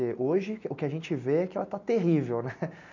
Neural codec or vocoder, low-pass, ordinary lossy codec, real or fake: none; 7.2 kHz; none; real